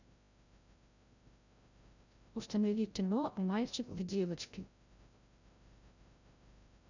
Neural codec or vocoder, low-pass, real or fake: codec, 16 kHz, 0.5 kbps, FreqCodec, larger model; 7.2 kHz; fake